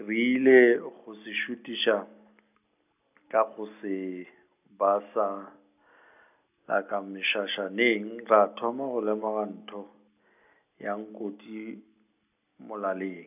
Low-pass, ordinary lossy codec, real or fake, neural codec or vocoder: 3.6 kHz; none; real; none